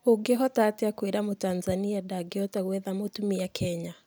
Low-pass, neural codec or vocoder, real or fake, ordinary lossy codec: none; none; real; none